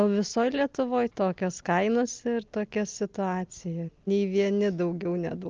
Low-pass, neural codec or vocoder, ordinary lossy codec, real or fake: 7.2 kHz; none; Opus, 24 kbps; real